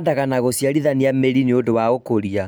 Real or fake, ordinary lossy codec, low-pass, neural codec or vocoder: real; none; none; none